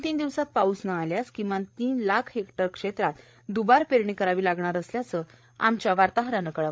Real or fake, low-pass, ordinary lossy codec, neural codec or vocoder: fake; none; none; codec, 16 kHz, 8 kbps, FreqCodec, larger model